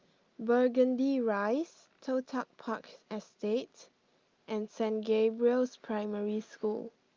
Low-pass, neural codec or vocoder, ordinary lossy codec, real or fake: 7.2 kHz; none; Opus, 24 kbps; real